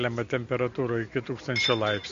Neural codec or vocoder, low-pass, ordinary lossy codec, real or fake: none; 7.2 kHz; MP3, 48 kbps; real